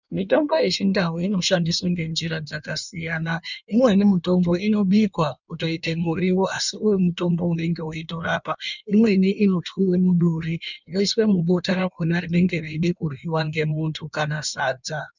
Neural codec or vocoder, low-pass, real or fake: codec, 16 kHz in and 24 kHz out, 1.1 kbps, FireRedTTS-2 codec; 7.2 kHz; fake